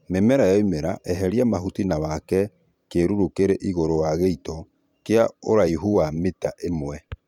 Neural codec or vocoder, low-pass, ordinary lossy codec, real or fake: vocoder, 44.1 kHz, 128 mel bands every 512 samples, BigVGAN v2; 19.8 kHz; none; fake